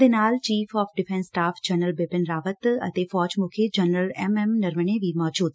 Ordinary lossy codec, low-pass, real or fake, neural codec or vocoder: none; none; real; none